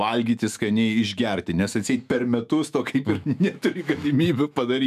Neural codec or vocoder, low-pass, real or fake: autoencoder, 48 kHz, 128 numbers a frame, DAC-VAE, trained on Japanese speech; 14.4 kHz; fake